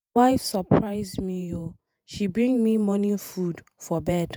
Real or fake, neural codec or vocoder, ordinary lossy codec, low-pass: fake; vocoder, 48 kHz, 128 mel bands, Vocos; none; none